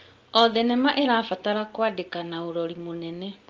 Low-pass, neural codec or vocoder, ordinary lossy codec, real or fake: 7.2 kHz; none; Opus, 24 kbps; real